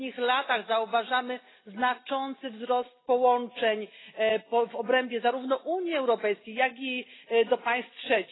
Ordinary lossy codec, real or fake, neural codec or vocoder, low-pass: AAC, 16 kbps; real; none; 7.2 kHz